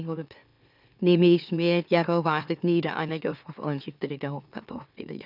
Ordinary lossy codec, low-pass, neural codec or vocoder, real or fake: none; 5.4 kHz; autoencoder, 44.1 kHz, a latent of 192 numbers a frame, MeloTTS; fake